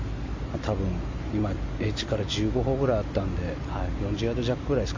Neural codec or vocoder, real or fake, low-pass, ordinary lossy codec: none; real; 7.2 kHz; AAC, 48 kbps